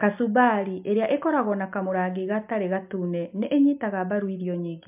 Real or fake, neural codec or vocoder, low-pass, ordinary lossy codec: real; none; 3.6 kHz; MP3, 32 kbps